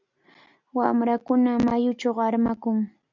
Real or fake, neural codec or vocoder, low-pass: real; none; 7.2 kHz